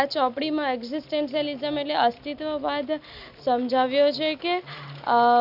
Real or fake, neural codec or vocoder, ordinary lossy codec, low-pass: real; none; none; 5.4 kHz